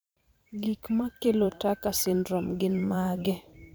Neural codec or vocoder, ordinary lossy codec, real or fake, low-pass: vocoder, 44.1 kHz, 128 mel bands every 512 samples, BigVGAN v2; none; fake; none